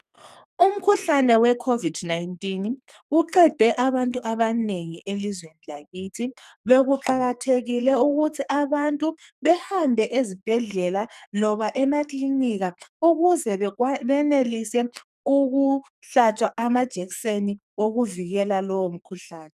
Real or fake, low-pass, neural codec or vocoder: fake; 14.4 kHz; codec, 44.1 kHz, 2.6 kbps, SNAC